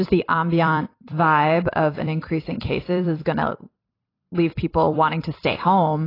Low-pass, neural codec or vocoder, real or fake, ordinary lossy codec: 5.4 kHz; vocoder, 44.1 kHz, 128 mel bands every 256 samples, BigVGAN v2; fake; AAC, 24 kbps